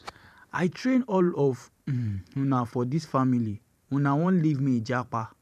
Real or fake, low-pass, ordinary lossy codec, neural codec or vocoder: fake; 14.4 kHz; none; vocoder, 44.1 kHz, 128 mel bands every 512 samples, BigVGAN v2